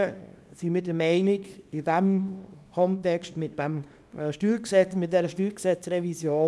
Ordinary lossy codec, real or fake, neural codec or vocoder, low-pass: none; fake; codec, 24 kHz, 0.9 kbps, WavTokenizer, small release; none